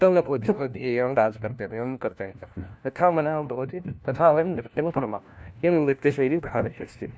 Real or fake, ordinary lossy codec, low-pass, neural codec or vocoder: fake; none; none; codec, 16 kHz, 1 kbps, FunCodec, trained on LibriTTS, 50 frames a second